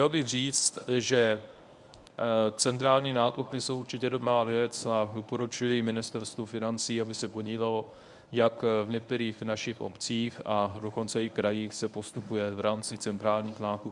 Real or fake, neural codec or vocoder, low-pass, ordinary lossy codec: fake; codec, 24 kHz, 0.9 kbps, WavTokenizer, medium speech release version 1; 10.8 kHz; Opus, 64 kbps